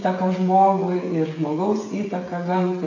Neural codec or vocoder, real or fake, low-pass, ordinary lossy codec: codec, 16 kHz, 16 kbps, FreqCodec, smaller model; fake; 7.2 kHz; AAC, 32 kbps